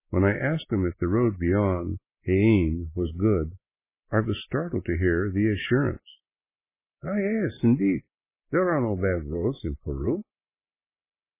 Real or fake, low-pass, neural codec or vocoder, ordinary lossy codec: real; 3.6 kHz; none; MP3, 16 kbps